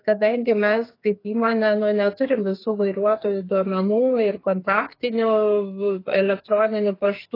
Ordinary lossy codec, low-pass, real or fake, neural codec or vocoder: AAC, 24 kbps; 5.4 kHz; fake; codec, 44.1 kHz, 2.6 kbps, SNAC